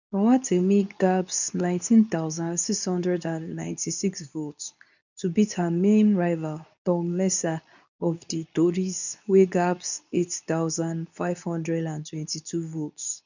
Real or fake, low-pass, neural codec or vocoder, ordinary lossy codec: fake; 7.2 kHz; codec, 24 kHz, 0.9 kbps, WavTokenizer, medium speech release version 2; none